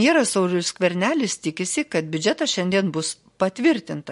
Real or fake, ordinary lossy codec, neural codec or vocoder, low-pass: real; MP3, 48 kbps; none; 14.4 kHz